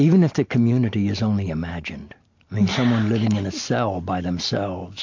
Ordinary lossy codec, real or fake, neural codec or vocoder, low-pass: MP3, 48 kbps; real; none; 7.2 kHz